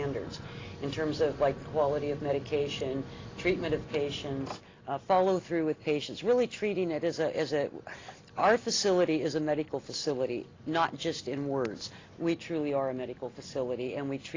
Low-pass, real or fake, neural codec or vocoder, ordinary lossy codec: 7.2 kHz; real; none; AAC, 32 kbps